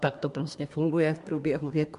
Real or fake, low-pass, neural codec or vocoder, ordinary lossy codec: fake; 10.8 kHz; codec, 24 kHz, 1 kbps, SNAC; MP3, 64 kbps